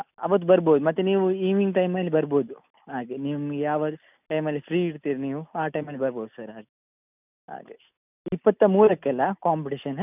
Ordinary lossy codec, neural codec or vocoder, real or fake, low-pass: none; none; real; 3.6 kHz